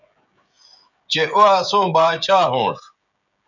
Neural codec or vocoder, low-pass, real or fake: codec, 16 kHz, 16 kbps, FreqCodec, smaller model; 7.2 kHz; fake